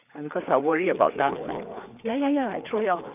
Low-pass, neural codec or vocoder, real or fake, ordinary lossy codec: 3.6 kHz; codec, 16 kHz, 4 kbps, FreqCodec, larger model; fake; none